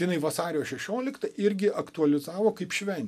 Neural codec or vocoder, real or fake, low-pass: none; real; 14.4 kHz